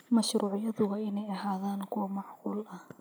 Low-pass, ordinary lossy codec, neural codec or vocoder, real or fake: none; none; none; real